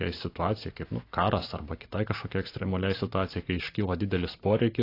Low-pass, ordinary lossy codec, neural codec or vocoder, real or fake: 5.4 kHz; AAC, 32 kbps; none; real